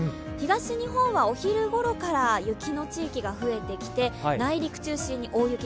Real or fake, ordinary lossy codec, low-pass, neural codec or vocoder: real; none; none; none